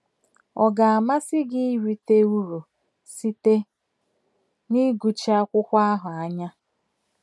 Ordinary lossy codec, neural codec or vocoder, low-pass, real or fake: none; none; none; real